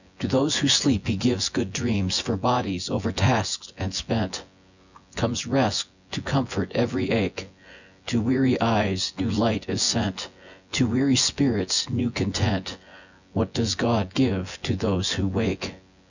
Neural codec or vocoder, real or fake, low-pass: vocoder, 24 kHz, 100 mel bands, Vocos; fake; 7.2 kHz